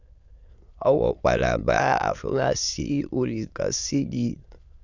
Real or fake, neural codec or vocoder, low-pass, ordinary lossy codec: fake; autoencoder, 22.05 kHz, a latent of 192 numbers a frame, VITS, trained on many speakers; 7.2 kHz; Opus, 64 kbps